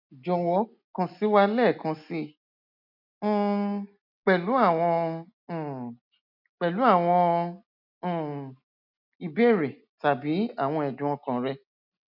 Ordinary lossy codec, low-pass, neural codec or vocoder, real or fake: none; 5.4 kHz; none; real